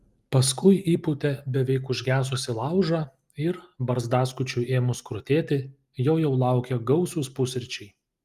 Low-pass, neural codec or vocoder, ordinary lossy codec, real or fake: 14.4 kHz; none; Opus, 32 kbps; real